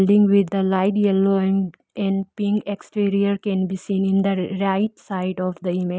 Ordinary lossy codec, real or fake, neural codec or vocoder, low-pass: none; real; none; none